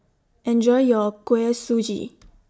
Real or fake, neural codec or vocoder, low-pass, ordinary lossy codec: real; none; none; none